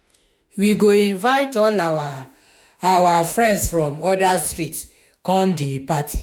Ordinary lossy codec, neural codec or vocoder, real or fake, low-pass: none; autoencoder, 48 kHz, 32 numbers a frame, DAC-VAE, trained on Japanese speech; fake; none